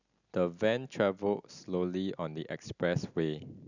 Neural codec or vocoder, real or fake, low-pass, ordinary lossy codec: none; real; 7.2 kHz; none